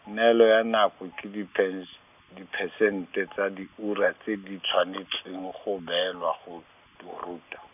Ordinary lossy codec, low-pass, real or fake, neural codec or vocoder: none; 3.6 kHz; real; none